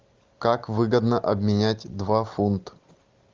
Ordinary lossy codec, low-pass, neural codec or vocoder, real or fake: Opus, 32 kbps; 7.2 kHz; none; real